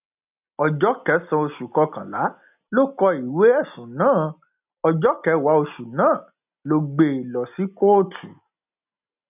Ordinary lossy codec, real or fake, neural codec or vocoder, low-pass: none; real; none; 3.6 kHz